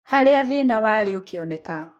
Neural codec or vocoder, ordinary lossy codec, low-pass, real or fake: codec, 44.1 kHz, 2.6 kbps, DAC; MP3, 64 kbps; 19.8 kHz; fake